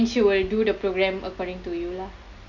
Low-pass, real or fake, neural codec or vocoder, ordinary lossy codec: 7.2 kHz; real; none; none